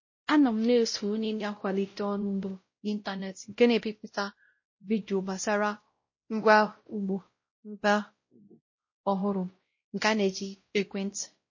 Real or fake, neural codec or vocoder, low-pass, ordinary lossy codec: fake; codec, 16 kHz, 0.5 kbps, X-Codec, WavLM features, trained on Multilingual LibriSpeech; 7.2 kHz; MP3, 32 kbps